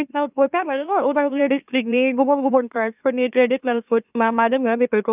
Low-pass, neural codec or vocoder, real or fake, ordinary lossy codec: 3.6 kHz; autoencoder, 44.1 kHz, a latent of 192 numbers a frame, MeloTTS; fake; none